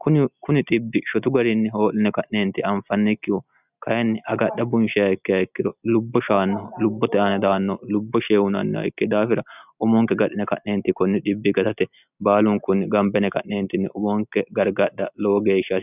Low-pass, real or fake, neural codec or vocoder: 3.6 kHz; real; none